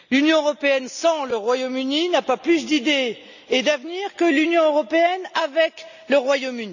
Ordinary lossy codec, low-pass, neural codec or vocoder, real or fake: none; 7.2 kHz; none; real